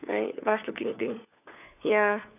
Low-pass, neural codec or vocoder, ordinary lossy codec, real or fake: 3.6 kHz; codec, 16 kHz, 4 kbps, FunCodec, trained on LibriTTS, 50 frames a second; none; fake